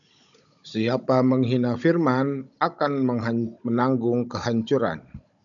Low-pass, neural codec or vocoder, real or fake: 7.2 kHz; codec, 16 kHz, 16 kbps, FunCodec, trained on Chinese and English, 50 frames a second; fake